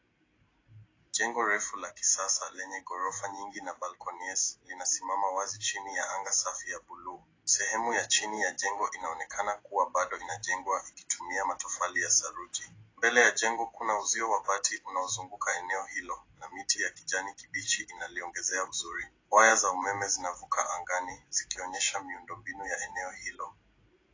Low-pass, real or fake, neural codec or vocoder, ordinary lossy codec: 7.2 kHz; real; none; AAC, 32 kbps